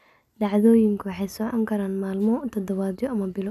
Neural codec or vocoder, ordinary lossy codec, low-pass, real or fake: none; none; 14.4 kHz; real